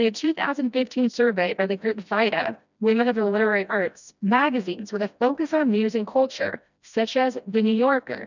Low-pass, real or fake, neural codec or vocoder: 7.2 kHz; fake; codec, 16 kHz, 1 kbps, FreqCodec, smaller model